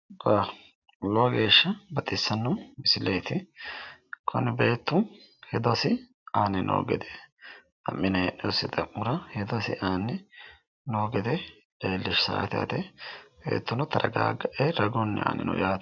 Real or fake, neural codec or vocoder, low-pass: real; none; 7.2 kHz